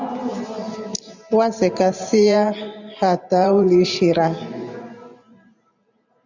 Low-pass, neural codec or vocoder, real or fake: 7.2 kHz; vocoder, 44.1 kHz, 128 mel bands every 512 samples, BigVGAN v2; fake